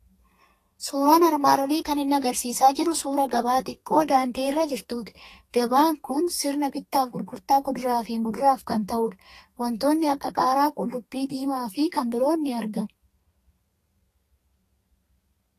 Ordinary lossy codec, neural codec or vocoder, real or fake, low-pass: AAC, 48 kbps; codec, 32 kHz, 1.9 kbps, SNAC; fake; 14.4 kHz